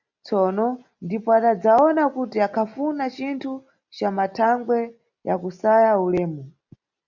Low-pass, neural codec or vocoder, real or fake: 7.2 kHz; none; real